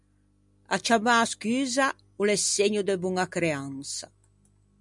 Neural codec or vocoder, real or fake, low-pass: none; real; 10.8 kHz